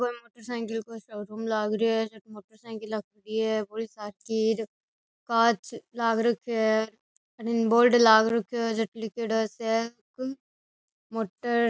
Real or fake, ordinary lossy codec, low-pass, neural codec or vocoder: real; none; none; none